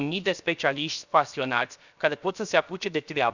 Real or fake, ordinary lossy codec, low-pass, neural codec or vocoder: fake; none; 7.2 kHz; codec, 16 kHz, 0.7 kbps, FocalCodec